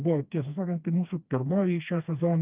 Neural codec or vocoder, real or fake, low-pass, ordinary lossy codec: codec, 16 kHz, 2 kbps, FreqCodec, smaller model; fake; 3.6 kHz; Opus, 24 kbps